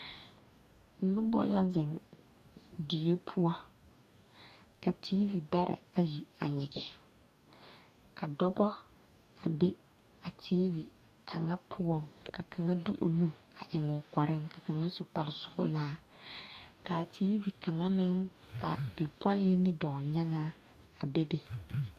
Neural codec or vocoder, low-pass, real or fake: codec, 44.1 kHz, 2.6 kbps, DAC; 14.4 kHz; fake